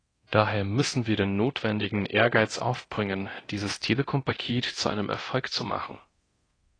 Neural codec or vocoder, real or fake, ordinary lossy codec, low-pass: codec, 24 kHz, 0.9 kbps, DualCodec; fake; AAC, 32 kbps; 9.9 kHz